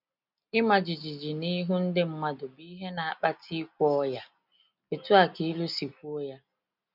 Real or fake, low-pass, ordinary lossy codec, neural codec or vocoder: real; 5.4 kHz; none; none